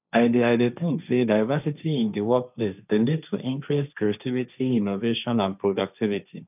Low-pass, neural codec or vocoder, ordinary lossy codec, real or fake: 3.6 kHz; codec, 16 kHz, 1.1 kbps, Voila-Tokenizer; none; fake